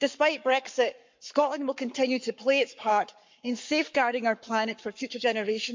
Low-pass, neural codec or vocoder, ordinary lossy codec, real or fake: 7.2 kHz; codec, 44.1 kHz, 7.8 kbps, Pupu-Codec; MP3, 64 kbps; fake